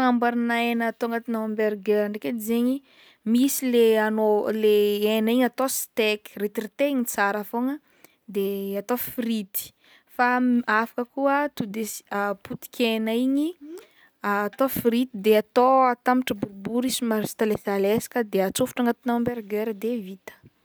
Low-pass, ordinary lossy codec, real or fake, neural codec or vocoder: none; none; real; none